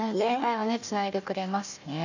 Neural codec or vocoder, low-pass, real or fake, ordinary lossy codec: codec, 16 kHz, 1 kbps, FunCodec, trained on Chinese and English, 50 frames a second; 7.2 kHz; fake; none